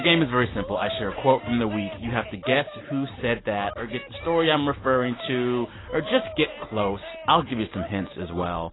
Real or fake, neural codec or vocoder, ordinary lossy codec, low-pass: real; none; AAC, 16 kbps; 7.2 kHz